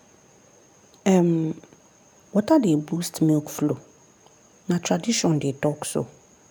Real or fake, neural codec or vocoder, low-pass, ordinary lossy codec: real; none; none; none